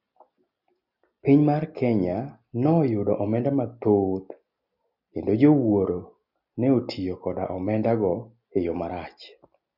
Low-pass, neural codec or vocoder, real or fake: 5.4 kHz; none; real